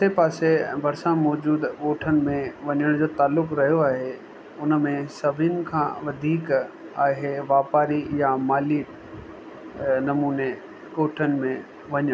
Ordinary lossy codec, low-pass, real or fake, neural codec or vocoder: none; none; real; none